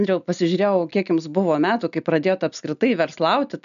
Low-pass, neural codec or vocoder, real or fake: 7.2 kHz; none; real